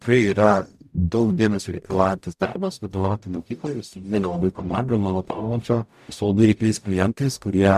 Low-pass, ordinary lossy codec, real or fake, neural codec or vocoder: 14.4 kHz; MP3, 96 kbps; fake; codec, 44.1 kHz, 0.9 kbps, DAC